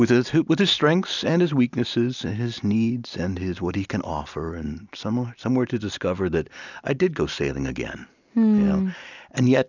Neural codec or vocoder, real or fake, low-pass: none; real; 7.2 kHz